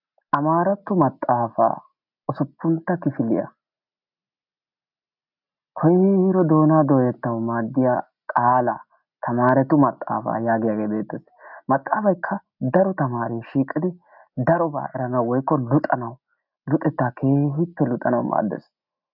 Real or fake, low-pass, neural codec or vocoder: real; 5.4 kHz; none